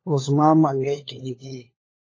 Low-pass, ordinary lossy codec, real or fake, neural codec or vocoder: 7.2 kHz; AAC, 32 kbps; fake; codec, 16 kHz, 4 kbps, FunCodec, trained on LibriTTS, 50 frames a second